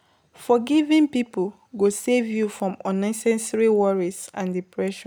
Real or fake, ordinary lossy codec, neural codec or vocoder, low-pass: real; none; none; none